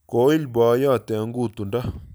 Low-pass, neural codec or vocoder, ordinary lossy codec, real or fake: none; none; none; real